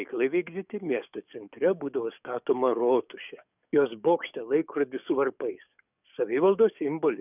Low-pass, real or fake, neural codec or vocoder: 3.6 kHz; fake; codec, 44.1 kHz, 7.8 kbps, DAC